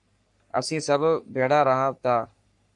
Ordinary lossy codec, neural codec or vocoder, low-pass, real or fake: AAC, 64 kbps; codec, 44.1 kHz, 3.4 kbps, Pupu-Codec; 10.8 kHz; fake